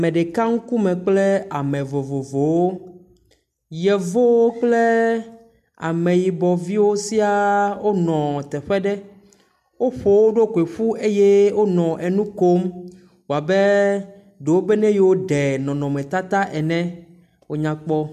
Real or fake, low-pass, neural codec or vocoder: real; 14.4 kHz; none